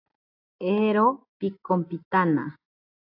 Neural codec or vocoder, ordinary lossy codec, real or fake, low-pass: none; AAC, 48 kbps; real; 5.4 kHz